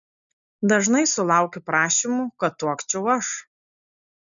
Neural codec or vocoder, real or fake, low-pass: none; real; 7.2 kHz